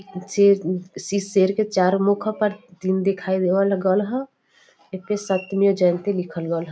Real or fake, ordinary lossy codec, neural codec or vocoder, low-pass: real; none; none; none